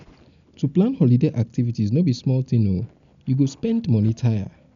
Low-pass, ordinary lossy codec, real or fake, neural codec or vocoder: 7.2 kHz; MP3, 96 kbps; real; none